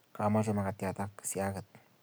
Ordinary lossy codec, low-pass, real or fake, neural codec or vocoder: none; none; real; none